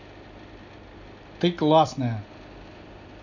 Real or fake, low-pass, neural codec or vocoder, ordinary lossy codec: real; 7.2 kHz; none; none